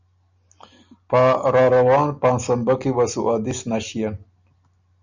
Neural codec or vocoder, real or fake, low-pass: none; real; 7.2 kHz